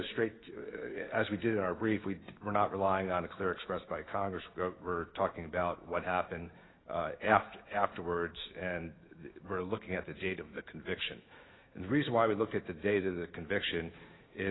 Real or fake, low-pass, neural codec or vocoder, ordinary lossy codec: fake; 7.2 kHz; autoencoder, 48 kHz, 128 numbers a frame, DAC-VAE, trained on Japanese speech; AAC, 16 kbps